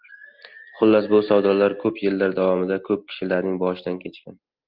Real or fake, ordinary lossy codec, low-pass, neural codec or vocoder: real; Opus, 32 kbps; 5.4 kHz; none